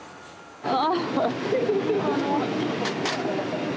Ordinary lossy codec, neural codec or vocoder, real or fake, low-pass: none; none; real; none